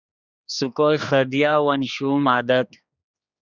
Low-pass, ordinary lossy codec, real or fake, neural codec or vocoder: 7.2 kHz; Opus, 64 kbps; fake; codec, 16 kHz, 2 kbps, X-Codec, HuBERT features, trained on general audio